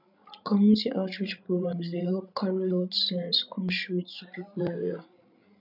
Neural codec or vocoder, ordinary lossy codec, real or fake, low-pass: codec, 16 kHz, 16 kbps, FreqCodec, larger model; none; fake; 5.4 kHz